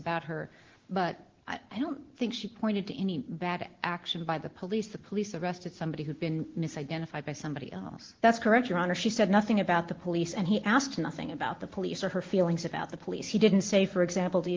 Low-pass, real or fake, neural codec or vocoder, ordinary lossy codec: 7.2 kHz; real; none; Opus, 16 kbps